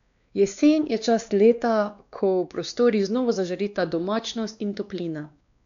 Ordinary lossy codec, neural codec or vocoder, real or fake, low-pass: none; codec, 16 kHz, 2 kbps, X-Codec, WavLM features, trained on Multilingual LibriSpeech; fake; 7.2 kHz